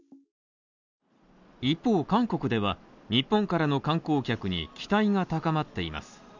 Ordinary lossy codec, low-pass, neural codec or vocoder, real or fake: none; 7.2 kHz; none; real